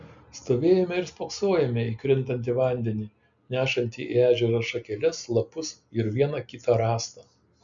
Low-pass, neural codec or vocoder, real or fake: 7.2 kHz; none; real